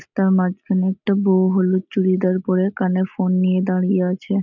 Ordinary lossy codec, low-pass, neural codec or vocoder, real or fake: none; 7.2 kHz; none; real